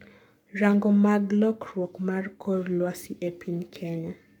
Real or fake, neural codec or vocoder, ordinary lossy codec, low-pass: fake; codec, 44.1 kHz, 7.8 kbps, Pupu-Codec; none; 19.8 kHz